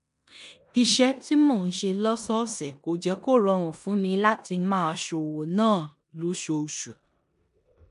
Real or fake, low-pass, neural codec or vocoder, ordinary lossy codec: fake; 10.8 kHz; codec, 16 kHz in and 24 kHz out, 0.9 kbps, LongCat-Audio-Codec, four codebook decoder; none